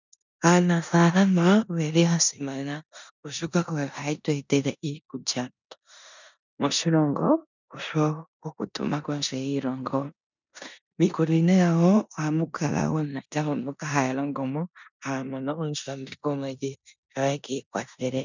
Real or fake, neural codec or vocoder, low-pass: fake; codec, 16 kHz in and 24 kHz out, 0.9 kbps, LongCat-Audio-Codec, four codebook decoder; 7.2 kHz